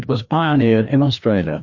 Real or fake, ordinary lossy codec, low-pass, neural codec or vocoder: fake; MP3, 48 kbps; 7.2 kHz; codec, 16 kHz, 2 kbps, FreqCodec, larger model